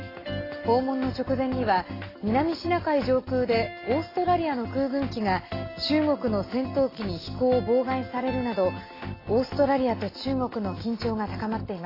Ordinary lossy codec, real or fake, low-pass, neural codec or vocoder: AAC, 24 kbps; real; 5.4 kHz; none